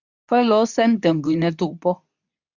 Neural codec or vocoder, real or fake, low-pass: codec, 24 kHz, 0.9 kbps, WavTokenizer, medium speech release version 2; fake; 7.2 kHz